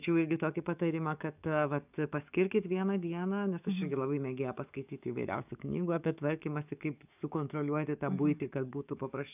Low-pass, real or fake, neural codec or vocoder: 3.6 kHz; fake; codec, 44.1 kHz, 7.8 kbps, DAC